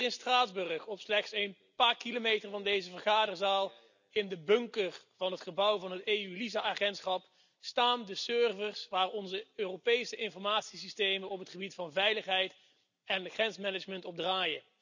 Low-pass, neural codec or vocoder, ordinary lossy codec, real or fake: 7.2 kHz; none; none; real